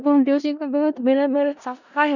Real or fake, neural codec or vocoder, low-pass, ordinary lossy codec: fake; codec, 16 kHz in and 24 kHz out, 0.4 kbps, LongCat-Audio-Codec, four codebook decoder; 7.2 kHz; none